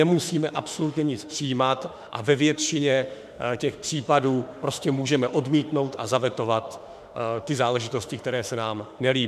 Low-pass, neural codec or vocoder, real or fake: 14.4 kHz; autoencoder, 48 kHz, 32 numbers a frame, DAC-VAE, trained on Japanese speech; fake